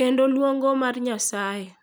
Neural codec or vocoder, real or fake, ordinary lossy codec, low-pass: none; real; none; none